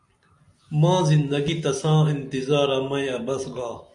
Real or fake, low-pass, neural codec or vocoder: real; 10.8 kHz; none